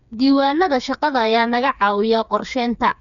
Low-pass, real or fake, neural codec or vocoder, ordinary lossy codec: 7.2 kHz; fake; codec, 16 kHz, 4 kbps, FreqCodec, smaller model; none